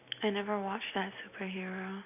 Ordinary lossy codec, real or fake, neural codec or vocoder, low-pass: none; real; none; 3.6 kHz